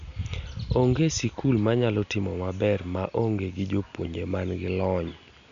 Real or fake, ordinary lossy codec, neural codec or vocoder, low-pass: real; none; none; 7.2 kHz